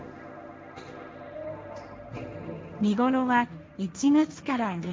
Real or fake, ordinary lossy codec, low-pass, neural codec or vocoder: fake; none; none; codec, 16 kHz, 1.1 kbps, Voila-Tokenizer